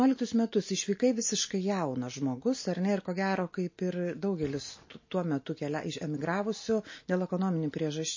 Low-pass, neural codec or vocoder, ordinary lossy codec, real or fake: 7.2 kHz; none; MP3, 32 kbps; real